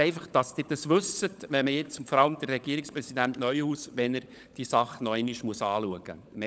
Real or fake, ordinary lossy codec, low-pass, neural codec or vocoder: fake; none; none; codec, 16 kHz, 16 kbps, FunCodec, trained on LibriTTS, 50 frames a second